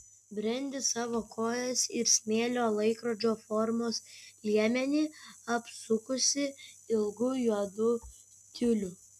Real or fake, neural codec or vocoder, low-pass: real; none; 14.4 kHz